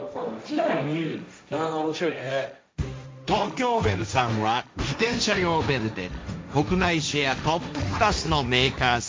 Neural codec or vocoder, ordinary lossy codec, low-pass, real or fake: codec, 16 kHz, 1.1 kbps, Voila-Tokenizer; none; none; fake